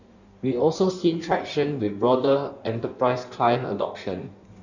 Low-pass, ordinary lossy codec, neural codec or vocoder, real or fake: 7.2 kHz; none; codec, 16 kHz in and 24 kHz out, 1.1 kbps, FireRedTTS-2 codec; fake